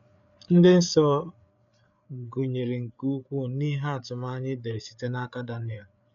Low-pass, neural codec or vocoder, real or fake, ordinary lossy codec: 7.2 kHz; codec, 16 kHz, 8 kbps, FreqCodec, larger model; fake; none